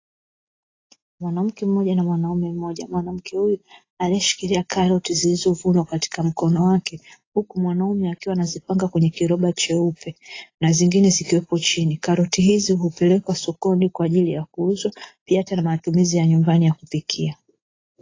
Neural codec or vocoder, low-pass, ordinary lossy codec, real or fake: none; 7.2 kHz; AAC, 32 kbps; real